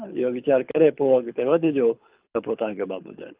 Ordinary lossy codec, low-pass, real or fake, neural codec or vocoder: Opus, 32 kbps; 3.6 kHz; fake; codec, 24 kHz, 6 kbps, HILCodec